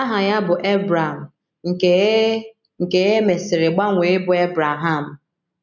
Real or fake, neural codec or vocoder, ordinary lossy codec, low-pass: real; none; none; 7.2 kHz